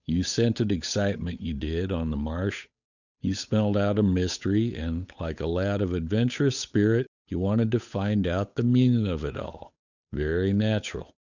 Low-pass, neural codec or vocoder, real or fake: 7.2 kHz; codec, 16 kHz, 8 kbps, FunCodec, trained on Chinese and English, 25 frames a second; fake